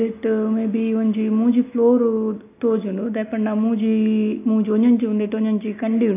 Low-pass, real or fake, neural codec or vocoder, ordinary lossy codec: 3.6 kHz; real; none; AAC, 24 kbps